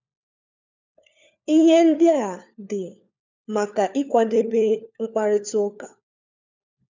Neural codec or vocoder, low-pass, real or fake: codec, 16 kHz, 4 kbps, FunCodec, trained on LibriTTS, 50 frames a second; 7.2 kHz; fake